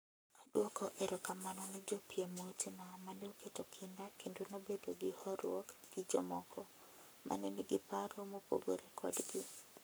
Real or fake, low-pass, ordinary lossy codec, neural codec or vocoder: fake; none; none; codec, 44.1 kHz, 7.8 kbps, Pupu-Codec